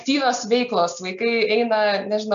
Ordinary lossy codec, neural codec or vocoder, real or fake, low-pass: AAC, 96 kbps; none; real; 7.2 kHz